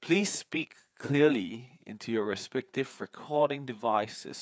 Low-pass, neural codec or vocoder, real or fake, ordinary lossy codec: none; codec, 16 kHz, 4 kbps, FreqCodec, larger model; fake; none